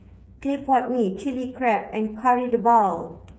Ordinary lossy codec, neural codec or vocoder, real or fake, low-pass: none; codec, 16 kHz, 4 kbps, FreqCodec, smaller model; fake; none